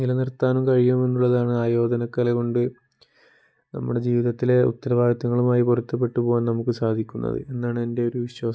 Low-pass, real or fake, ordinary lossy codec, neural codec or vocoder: none; real; none; none